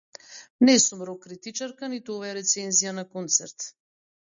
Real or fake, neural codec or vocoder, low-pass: real; none; 7.2 kHz